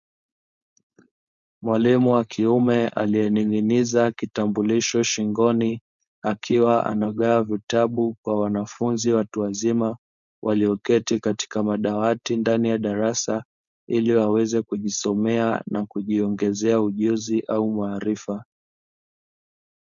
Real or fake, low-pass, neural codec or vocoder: fake; 7.2 kHz; codec, 16 kHz, 4.8 kbps, FACodec